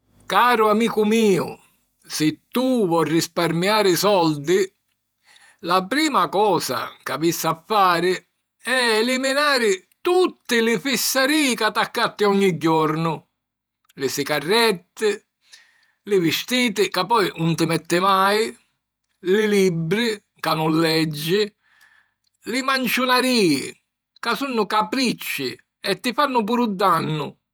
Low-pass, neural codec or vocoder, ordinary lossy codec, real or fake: none; vocoder, 48 kHz, 128 mel bands, Vocos; none; fake